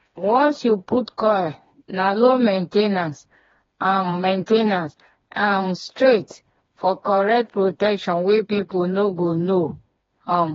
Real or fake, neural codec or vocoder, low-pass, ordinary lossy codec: fake; codec, 16 kHz, 2 kbps, FreqCodec, smaller model; 7.2 kHz; AAC, 24 kbps